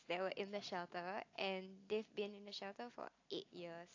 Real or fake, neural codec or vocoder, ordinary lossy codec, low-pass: real; none; AAC, 48 kbps; 7.2 kHz